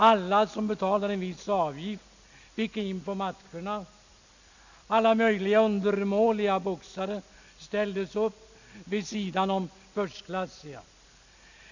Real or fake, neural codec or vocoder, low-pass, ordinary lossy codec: real; none; 7.2 kHz; none